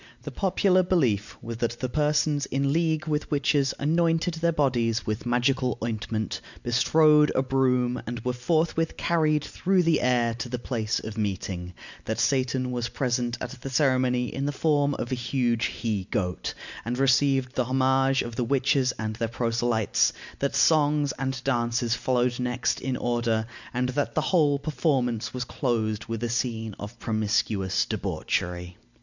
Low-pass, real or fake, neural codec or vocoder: 7.2 kHz; real; none